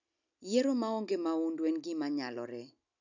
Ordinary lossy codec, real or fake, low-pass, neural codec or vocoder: none; real; 7.2 kHz; none